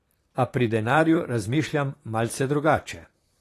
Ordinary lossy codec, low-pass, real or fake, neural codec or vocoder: AAC, 48 kbps; 14.4 kHz; fake; vocoder, 44.1 kHz, 128 mel bands, Pupu-Vocoder